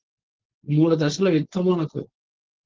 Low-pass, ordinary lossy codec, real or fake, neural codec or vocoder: 7.2 kHz; Opus, 16 kbps; fake; codec, 16 kHz, 4.8 kbps, FACodec